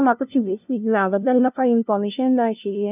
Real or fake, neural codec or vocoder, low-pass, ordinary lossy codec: fake; codec, 16 kHz, 0.5 kbps, FunCodec, trained on LibriTTS, 25 frames a second; 3.6 kHz; none